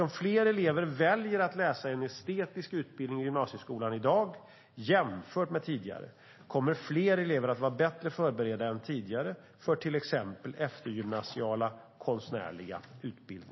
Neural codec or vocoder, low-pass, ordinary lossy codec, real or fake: none; 7.2 kHz; MP3, 24 kbps; real